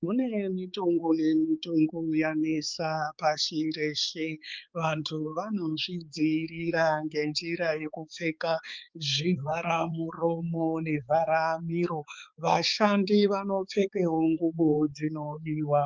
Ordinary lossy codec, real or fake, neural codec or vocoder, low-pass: Opus, 24 kbps; fake; codec, 16 kHz, 4 kbps, X-Codec, HuBERT features, trained on balanced general audio; 7.2 kHz